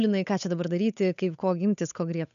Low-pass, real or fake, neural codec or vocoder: 7.2 kHz; fake; codec, 16 kHz, 8 kbps, FunCodec, trained on Chinese and English, 25 frames a second